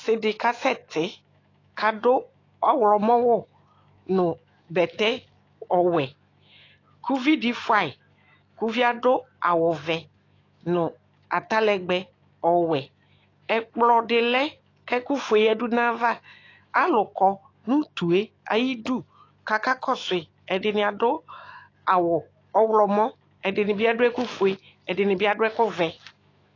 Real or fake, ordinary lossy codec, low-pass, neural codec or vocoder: fake; AAC, 32 kbps; 7.2 kHz; autoencoder, 48 kHz, 128 numbers a frame, DAC-VAE, trained on Japanese speech